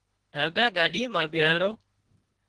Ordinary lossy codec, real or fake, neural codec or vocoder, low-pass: Opus, 16 kbps; fake; codec, 24 kHz, 1.5 kbps, HILCodec; 10.8 kHz